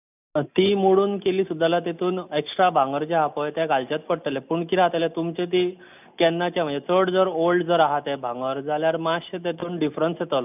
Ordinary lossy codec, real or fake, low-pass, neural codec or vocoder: none; real; 3.6 kHz; none